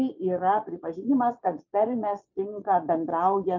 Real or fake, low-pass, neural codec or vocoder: fake; 7.2 kHz; vocoder, 22.05 kHz, 80 mel bands, Vocos